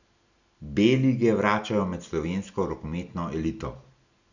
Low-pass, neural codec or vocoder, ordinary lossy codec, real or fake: 7.2 kHz; none; none; real